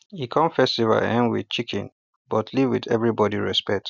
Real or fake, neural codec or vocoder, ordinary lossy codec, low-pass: real; none; none; 7.2 kHz